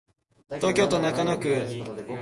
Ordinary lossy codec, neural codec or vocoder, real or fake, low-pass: MP3, 64 kbps; vocoder, 48 kHz, 128 mel bands, Vocos; fake; 10.8 kHz